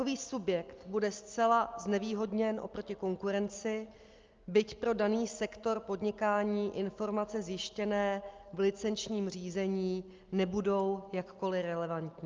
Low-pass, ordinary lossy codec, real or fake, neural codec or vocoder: 7.2 kHz; Opus, 24 kbps; real; none